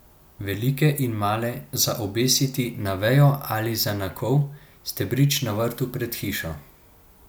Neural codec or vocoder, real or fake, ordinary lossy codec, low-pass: none; real; none; none